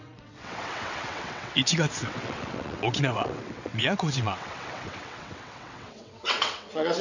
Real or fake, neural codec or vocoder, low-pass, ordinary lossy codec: fake; vocoder, 22.05 kHz, 80 mel bands, WaveNeXt; 7.2 kHz; none